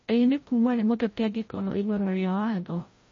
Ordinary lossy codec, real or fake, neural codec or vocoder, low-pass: MP3, 32 kbps; fake; codec, 16 kHz, 0.5 kbps, FreqCodec, larger model; 7.2 kHz